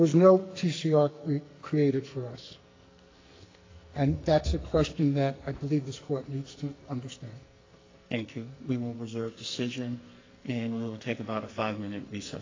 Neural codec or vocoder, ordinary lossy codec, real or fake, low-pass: codec, 44.1 kHz, 2.6 kbps, SNAC; AAC, 32 kbps; fake; 7.2 kHz